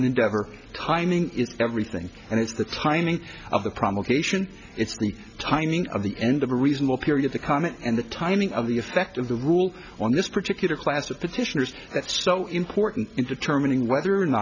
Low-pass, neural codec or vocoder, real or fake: 7.2 kHz; none; real